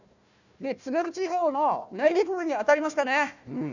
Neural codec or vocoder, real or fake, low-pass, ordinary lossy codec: codec, 16 kHz, 1 kbps, FunCodec, trained on Chinese and English, 50 frames a second; fake; 7.2 kHz; none